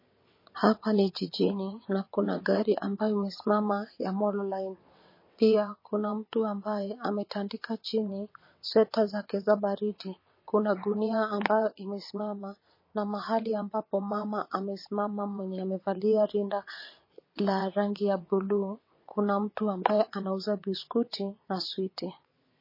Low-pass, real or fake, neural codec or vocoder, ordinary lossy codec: 5.4 kHz; fake; vocoder, 22.05 kHz, 80 mel bands, WaveNeXt; MP3, 24 kbps